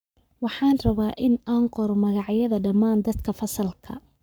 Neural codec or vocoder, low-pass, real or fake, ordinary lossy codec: codec, 44.1 kHz, 7.8 kbps, Pupu-Codec; none; fake; none